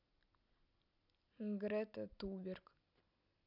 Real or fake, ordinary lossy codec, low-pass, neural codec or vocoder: real; none; 5.4 kHz; none